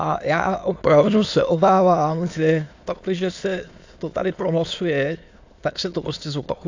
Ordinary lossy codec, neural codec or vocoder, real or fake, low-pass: AAC, 48 kbps; autoencoder, 22.05 kHz, a latent of 192 numbers a frame, VITS, trained on many speakers; fake; 7.2 kHz